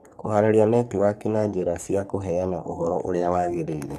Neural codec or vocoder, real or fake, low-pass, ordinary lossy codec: codec, 44.1 kHz, 3.4 kbps, Pupu-Codec; fake; 14.4 kHz; none